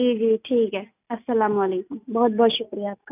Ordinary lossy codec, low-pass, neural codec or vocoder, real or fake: none; 3.6 kHz; none; real